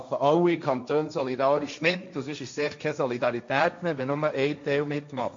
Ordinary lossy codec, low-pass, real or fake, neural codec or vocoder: MP3, 48 kbps; 7.2 kHz; fake; codec, 16 kHz, 1.1 kbps, Voila-Tokenizer